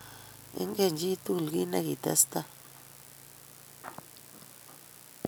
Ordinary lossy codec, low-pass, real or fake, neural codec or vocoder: none; none; real; none